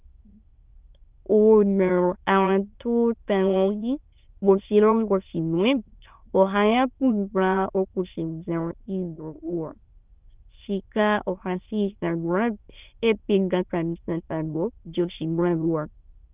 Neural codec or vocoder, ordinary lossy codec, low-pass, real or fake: autoencoder, 22.05 kHz, a latent of 192 numbers a frame, VITS, trained on many speakers; Opus, 32 kbps; 3.6 kHz; fake